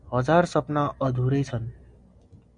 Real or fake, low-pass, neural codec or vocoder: real; 9.9 kHz; none